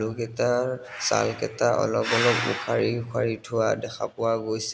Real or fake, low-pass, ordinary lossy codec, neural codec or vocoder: real; none; none; none